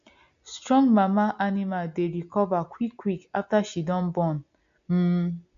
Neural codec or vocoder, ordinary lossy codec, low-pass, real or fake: none; AAC, 64 kbps; 7.2 kHz; real